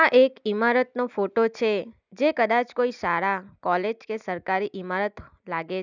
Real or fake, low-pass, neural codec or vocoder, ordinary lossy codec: real; 7.2 kHz; none; none